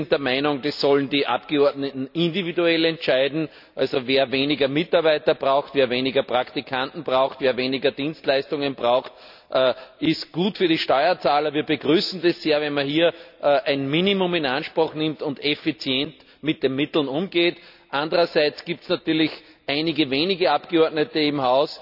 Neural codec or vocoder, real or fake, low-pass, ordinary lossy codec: none; real; 5.4 kHz; none